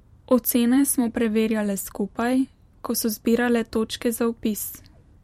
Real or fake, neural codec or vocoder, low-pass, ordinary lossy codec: fake; vocoder, 44.1 kHz, 128 mel bands every 512 samples, BigVGAN v2; 19.8 kHz; MP3, 64 kbps